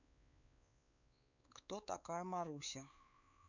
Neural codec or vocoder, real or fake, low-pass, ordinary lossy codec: codec, 16 kHz, 4 kbps, X-Codec, WavLM features, trained on Multilingual LibriSpeech; fake; 7.2 kHz; none